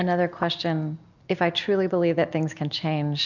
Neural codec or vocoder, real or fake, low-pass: none; real; 7.2 kHz